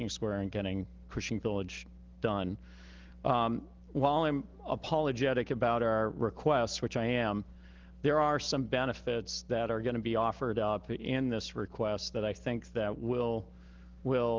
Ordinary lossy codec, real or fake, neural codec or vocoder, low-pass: Opus, 16 kbps; real; none; 7.2 kHz